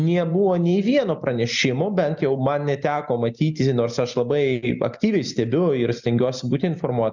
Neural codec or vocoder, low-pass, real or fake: none; 7.2 kHz; real